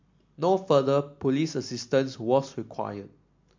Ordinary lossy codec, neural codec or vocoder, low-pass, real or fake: MP3, 48 kbps; none; 7.2 kHz; real